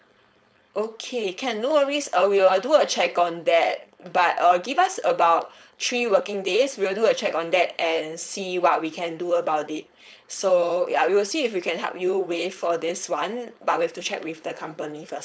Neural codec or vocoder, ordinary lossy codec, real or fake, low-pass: codec, 16 kHz, 4.8 kbps, FACodec; none; fake; none